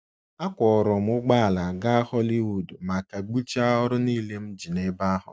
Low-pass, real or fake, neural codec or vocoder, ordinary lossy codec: none; real; none; none